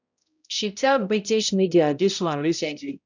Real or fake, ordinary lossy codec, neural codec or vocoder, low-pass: fake; none; codec, 16 kHz, 0.5 kbps, X-Codec, HuBERT features, trained on balanced general audio; 7.2 kHz